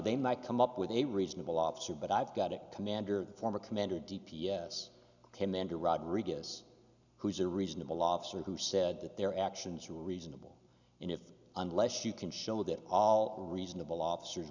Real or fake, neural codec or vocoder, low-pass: real; none; 7.2 kHz